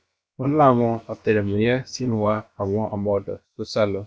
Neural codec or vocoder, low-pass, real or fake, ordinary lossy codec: codec, 16 kHz, about 1 kbps, DyCAST, with the encoder's durations; none; fake; none